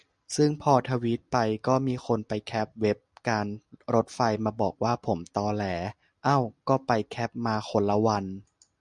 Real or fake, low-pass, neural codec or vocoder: real; 10.8 kHz; none